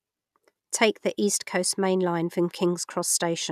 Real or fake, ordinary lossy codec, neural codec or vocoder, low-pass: real; none; none; 14.4 kHz